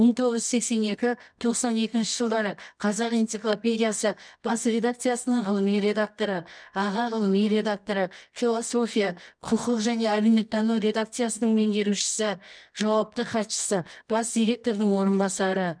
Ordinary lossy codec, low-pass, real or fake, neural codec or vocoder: none; 9.9 kHz; fake; codec, 24 kHz, 0.9 kbps, WavTokenizer, medium music audio release